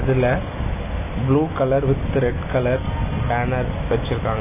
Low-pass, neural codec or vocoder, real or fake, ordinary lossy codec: 3.6 kHz; none; real; MP3, 16 kbps